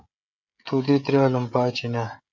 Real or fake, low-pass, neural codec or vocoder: fake; 7.2 kHz; codec, 16 kHz, 16 kbps, FreqCodec, smaller model